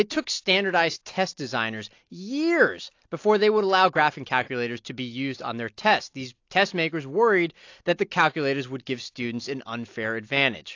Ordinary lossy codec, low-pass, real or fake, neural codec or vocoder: AAC, 48 kbps; 7.2 kHz; real; none